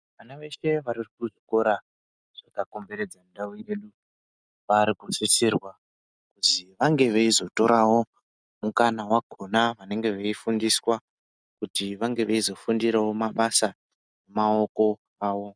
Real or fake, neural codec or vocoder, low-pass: real; none; 9.9 kHz